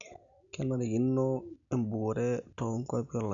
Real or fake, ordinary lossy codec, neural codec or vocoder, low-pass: real; none; none; 7.2 kHz